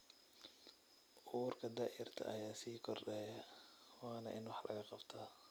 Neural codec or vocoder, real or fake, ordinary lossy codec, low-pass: none; real; none; none